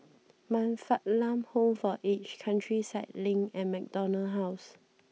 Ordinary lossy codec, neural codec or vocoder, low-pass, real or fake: none; none; none; real